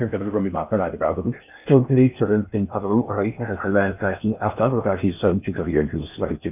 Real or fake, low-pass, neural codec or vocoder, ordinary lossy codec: fake; 3.6 kHz; codec, 16 kHz in and 24 kHz out, 0.6 kbps, FocalCodec, streaming, 4096 codes; none